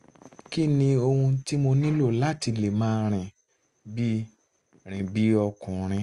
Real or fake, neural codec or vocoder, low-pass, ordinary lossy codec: real; none; 10.8 kHz; Opus, 32 kbps